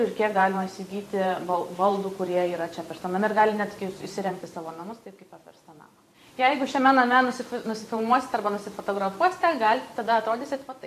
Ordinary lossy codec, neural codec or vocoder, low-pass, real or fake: AAC, 64 kbps; vocoder, 44.1 kHz, 128 mel bands every 512 samples, BigVGAN v2; 14.4 kHz; fake